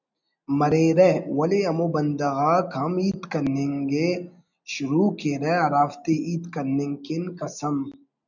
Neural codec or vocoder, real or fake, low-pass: none; real; 7.2 kHz